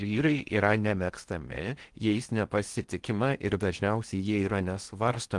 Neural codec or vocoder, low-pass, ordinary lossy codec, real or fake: codec, 16 kHz in and 24 kHz out, 0.6 kbps, FocalCodec, streaming, 2048 codes; 10.8 kHz; Opus, 24 kbps; fake